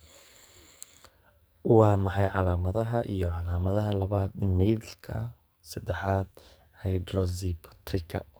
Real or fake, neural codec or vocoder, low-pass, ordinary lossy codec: fake; codec, 44.1 kHz, 2.6 kbps, SNAC; none; none